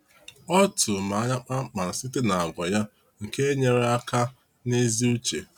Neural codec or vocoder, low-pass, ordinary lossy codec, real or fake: none; 19.8 kHz; none; real